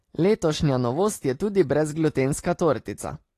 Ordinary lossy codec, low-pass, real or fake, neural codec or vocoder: AAC, 48 kbps; 14.4 kHz; real; none